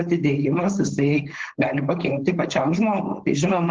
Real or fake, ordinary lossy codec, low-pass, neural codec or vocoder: fake; Opus, 16 kbps; 7.2 kHz; codec, 16 kHz, 4.8 kbps, FACodec